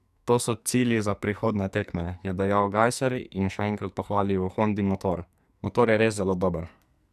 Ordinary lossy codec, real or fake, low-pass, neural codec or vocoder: none; fake; 14.4 kHz; codec, 44.1 kHz, 2.6 kbps, SNAC